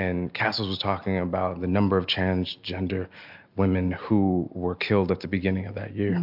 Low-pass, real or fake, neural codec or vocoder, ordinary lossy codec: 5.4 kHz; real; none; MP3, 48 kbps